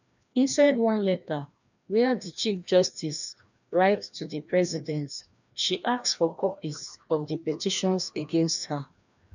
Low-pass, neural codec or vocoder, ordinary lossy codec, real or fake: 7.2 kHz; codec, 16 kHz, 1 kbps, FreqCodec, larger model; none; fake